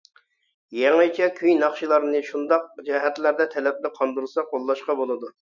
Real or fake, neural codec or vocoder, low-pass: real; none; 7.2 kHz